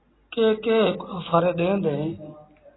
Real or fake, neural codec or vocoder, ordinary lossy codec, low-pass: real; none; AAC, 16 kbps; 7.2 kHz